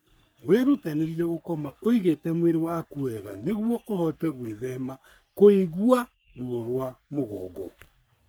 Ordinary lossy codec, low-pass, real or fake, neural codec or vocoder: none; none; fake; codec, 44.1 kHz, 3.4 kbps, Pupu-Codec